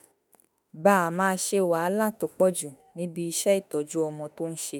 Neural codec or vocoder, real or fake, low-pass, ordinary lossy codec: autoencoder, 48 kHz, 32 numbers a frame, DAC-VAE, trained on Japanese speech; fake; none; none